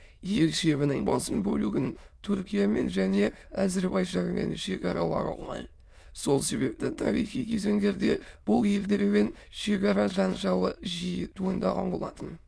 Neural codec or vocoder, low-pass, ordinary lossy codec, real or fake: autoencoder, 22.05 kHz, a latent of 192 numbers a frame, VITS, trained on many speakers; none; none; fake